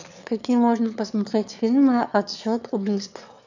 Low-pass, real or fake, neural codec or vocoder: 7.2 kHz; fake; autoencoder, 22.05 kHz, a latent of 192 numbers a frame, VITS, trained on one speaker